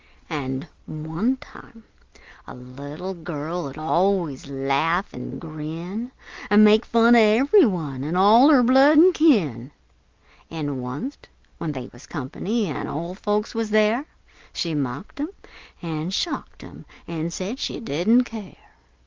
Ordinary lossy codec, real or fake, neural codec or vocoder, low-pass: Opus, 32 kbps; real; none; 7.2 kHz